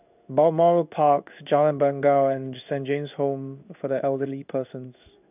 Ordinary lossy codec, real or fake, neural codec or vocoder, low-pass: none; fake; codec, 16 kHz in and 24 kHz out, 1 kbps, XY-Tokenizer; 3.6 kHz